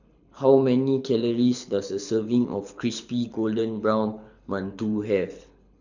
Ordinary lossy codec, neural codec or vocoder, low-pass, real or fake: none; codec, 24 kHz, 6 kbps, HILCodec; 7.2 kHz; fake